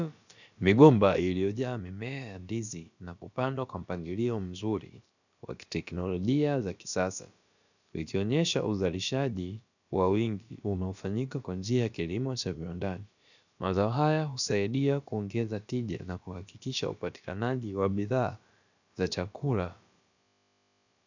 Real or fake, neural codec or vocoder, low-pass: fake; codec, 16 kHz, about 1 kbps, DyCAST, with the encoder's durations; 7.2 kHz